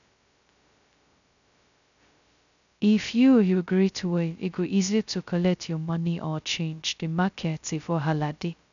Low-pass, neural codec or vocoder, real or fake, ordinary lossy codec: 7.2 kHz; codec, 16 kHz, 0.2 kbps, FocalCodec; fake; none